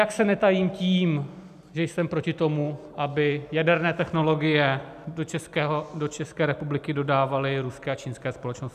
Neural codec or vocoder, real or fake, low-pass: none; real; 14.4 kHz